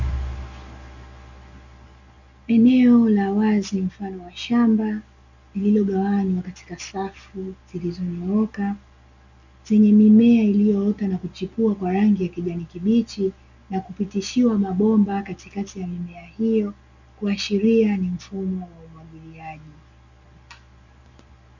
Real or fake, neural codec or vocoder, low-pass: real; none; 7.2 kHz